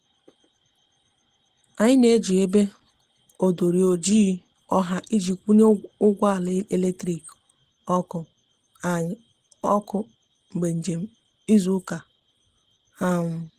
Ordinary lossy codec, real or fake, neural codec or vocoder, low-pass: Opus, 16 kbps; real; none; 14.4 kHz